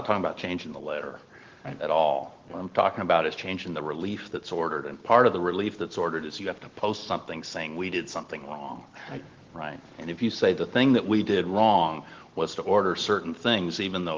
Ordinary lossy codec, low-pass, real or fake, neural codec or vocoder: Opus, 16 kbps; 7.2 kHz; real; none